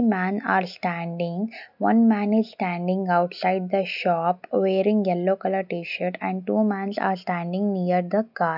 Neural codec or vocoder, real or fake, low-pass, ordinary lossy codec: none; real; 5.4 kHz; none